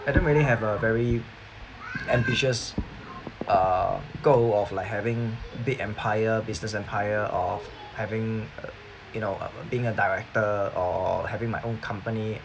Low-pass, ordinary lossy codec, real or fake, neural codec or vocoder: none; none; real; none